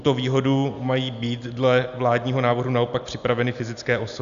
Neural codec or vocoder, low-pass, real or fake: none; 7.2 kHz; real